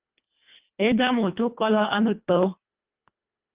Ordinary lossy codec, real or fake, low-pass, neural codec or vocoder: Opus, 32 kbps; fake; 3.6 kHz; codec, 24 kHz, 1.5 kbps, HILCodec